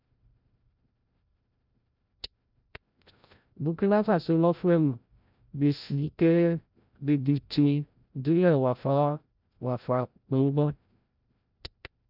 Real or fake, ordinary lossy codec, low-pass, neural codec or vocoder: fake; none; 5.4 kHz; codec, 16 kHz, 0.5 kbps, FreqCodec, larger model